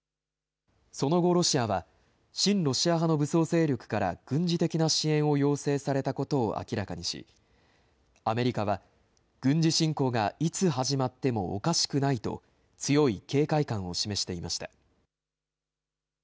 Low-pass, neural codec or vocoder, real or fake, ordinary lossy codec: none; none; real; none